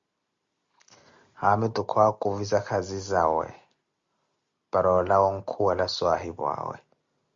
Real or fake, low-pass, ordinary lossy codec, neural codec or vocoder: real; 7.2 kHz; Opus, 64 kbps; none